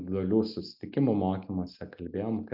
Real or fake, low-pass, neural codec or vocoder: real; 5.4 kHz; none